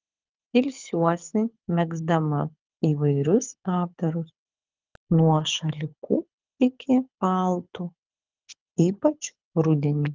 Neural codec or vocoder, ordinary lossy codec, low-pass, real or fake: codec, 24 kHz, 6 kbps, HILCodec; Opus, 24 kbps; 7.2 kHz; fake